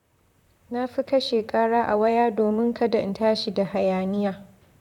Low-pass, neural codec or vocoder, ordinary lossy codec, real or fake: 19.8 kHz; vocoder, 44.1 kHz, 128 mel bands, Pupu-Vocoder; none; fake